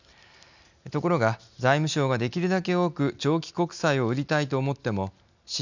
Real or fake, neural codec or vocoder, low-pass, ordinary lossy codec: real; none; 7.2 kHz; none